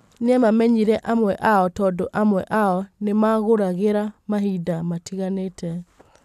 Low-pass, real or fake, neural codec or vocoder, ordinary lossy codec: 14.4 kHz; real; none; none